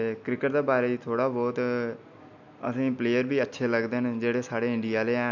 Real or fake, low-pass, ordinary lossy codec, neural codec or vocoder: real; 7.2 kHz; none; none